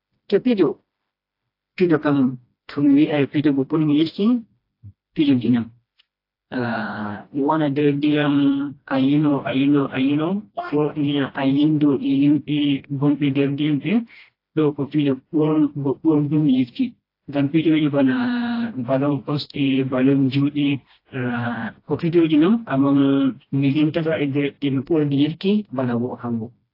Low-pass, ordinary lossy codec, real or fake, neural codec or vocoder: 5.4 kHz; AAC, 32 kbps; fake; codec, 16 kHz, 1 kbps, FreqCodec, smaller model